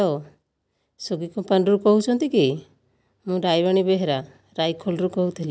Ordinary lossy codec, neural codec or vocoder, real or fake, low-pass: none; none; real; none